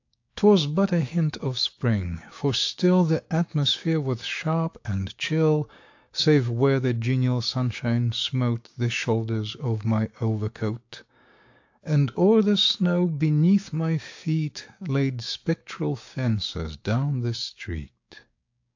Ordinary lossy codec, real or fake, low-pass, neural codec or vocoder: AAC, 48 kbps; fake; 7.2 kHz; codec, 16 kHz, 6 kbps, DAC